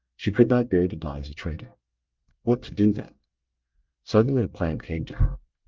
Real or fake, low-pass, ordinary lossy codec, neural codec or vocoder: fake; 7.2 kHz; Opus, 24 kbps; codec, 24 kHz, 1 kbps, SNAC